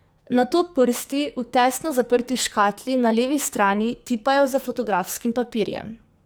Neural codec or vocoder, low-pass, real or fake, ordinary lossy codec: codec, 44.1 kHz, 2.6 kbps, SNAC; none; fake; none